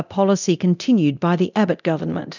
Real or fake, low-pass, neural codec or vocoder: fake; 7.2 kHz; codec, 24 kHz, 0.9 kbps, DualCodec